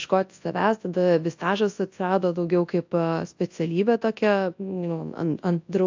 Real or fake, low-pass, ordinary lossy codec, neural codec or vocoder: fake; 7.2 kHz; AAC, 48 kbps; codec, 24 kHz, 0.9 kbps, WavTokenizer, large speech release